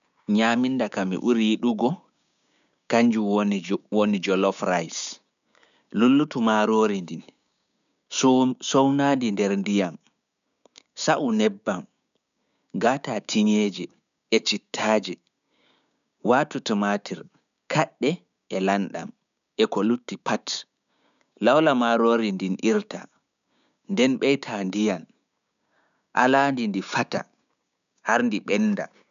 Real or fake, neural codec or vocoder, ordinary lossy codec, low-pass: fake; codec, 16 kHz, 6 kbps, DAC; none; 7.2 kHz